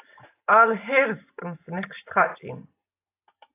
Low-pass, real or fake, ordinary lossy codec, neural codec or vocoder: 3.6 kHz; real; AAC, 24 kbps; none